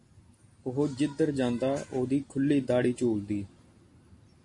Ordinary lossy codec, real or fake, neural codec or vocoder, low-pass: MP3, 48 kbps; real; none; 10.8 kHz